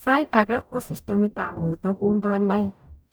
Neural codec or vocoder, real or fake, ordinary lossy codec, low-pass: codec, 44.1 kHz, 0.9 kbps, DAC; fake; none; none